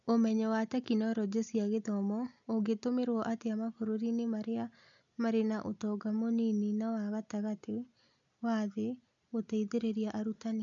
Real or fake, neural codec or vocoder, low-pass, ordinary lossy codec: real; none; 7.2 kHz; none